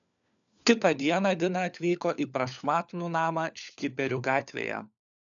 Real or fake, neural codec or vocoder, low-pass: fake; codec, 16 kHz, 4 kbps, FunCodec, trained on LibriTTS, 50 frames a second; 7.2 kHz